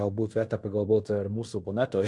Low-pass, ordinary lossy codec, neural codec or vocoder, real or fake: 10.8 kHz; MP3, 64 kbps; codec, 16 kHz in and 24 kHz out, 0.9 kbps, LongCat-Audio-Codec, fine tuned four codebook decoder; fake